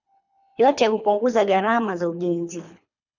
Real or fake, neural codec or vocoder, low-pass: fake; codec, 24 kHz, 3 kbps, HILCodec; 7.2 kHz